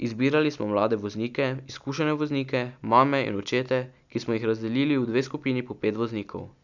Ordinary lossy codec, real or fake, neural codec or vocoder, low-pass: none; real; none; 7.2 kHz